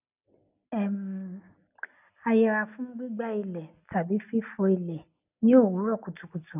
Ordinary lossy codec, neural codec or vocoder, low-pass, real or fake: none; none; 3.6 kHz; real